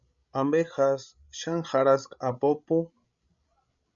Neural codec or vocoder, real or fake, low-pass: codec, 16 kHz, 16 kbps, FreqCodec, larger model; fake; 7.2 kHz